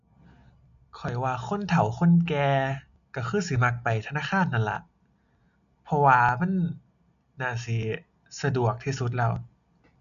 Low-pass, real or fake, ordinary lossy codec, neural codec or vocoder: 7.2 kHz; real; none; none